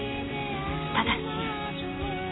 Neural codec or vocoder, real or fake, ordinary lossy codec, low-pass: none; real; AAC, 16 kbps; 7.2 kHz